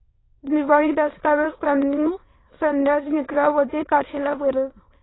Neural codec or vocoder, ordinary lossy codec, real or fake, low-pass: autoencoder, 22.05 kHz, a latent of 192 numbers a frame, VITS, trained on many speakers; AAC, 16 kbps; fake; 7.2 kHz